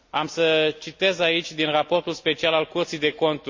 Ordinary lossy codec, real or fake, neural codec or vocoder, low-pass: MP3, 48 kbps; real; none; 7.2 kHz